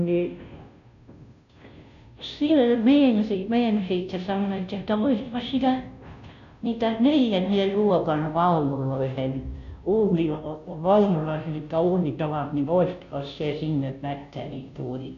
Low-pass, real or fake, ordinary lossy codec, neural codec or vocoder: 7.2 kHz; fake; none; codec, 16 kHz, 0.5 kbps, FunCodec, trained on Chinese and English, 25 frames a second